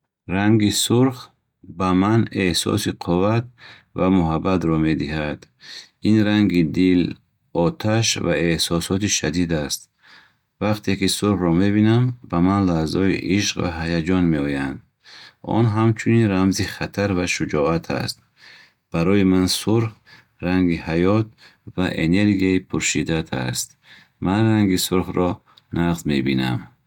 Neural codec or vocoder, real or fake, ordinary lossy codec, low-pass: none; real; none; 19.8 kHz